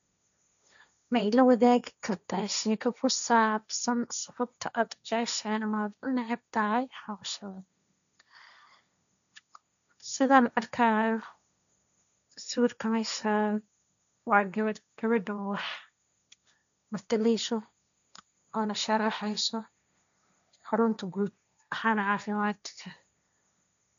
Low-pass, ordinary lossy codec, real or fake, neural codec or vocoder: 7.2 kHz; none; fake; codec, 16 kHz, 1.1 kbps, Voila-Tokenizer